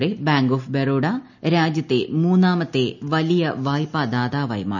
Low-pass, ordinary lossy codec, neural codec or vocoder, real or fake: 7.2 kHz; none; none; real